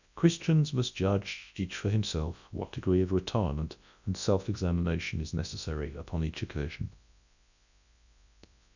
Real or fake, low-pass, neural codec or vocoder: fake; 7.2 kHz; codec, 24 kHz, 0.9 kbps, WavTokenizer, large speech release